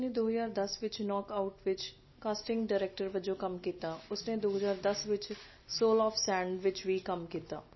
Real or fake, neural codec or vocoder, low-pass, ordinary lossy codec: real; none; 7.2 kHz; MP3, 24 kbps